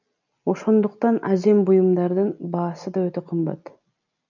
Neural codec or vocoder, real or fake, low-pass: none; real; 7.2 kHz